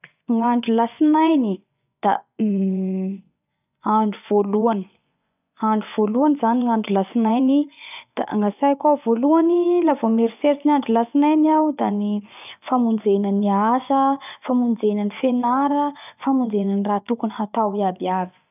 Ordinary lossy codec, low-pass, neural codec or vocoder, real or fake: none; 3.6 kHz; vocoder, 24 kHz, 100 mel bands, Vocos; fake